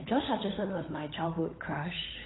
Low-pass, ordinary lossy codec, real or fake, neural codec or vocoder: 7.2 kHz; AAC, 16 kbps; fake; codec, 16 kHz, 16 kbps, FunCodec, trained on LibriTTS, 50 frames a second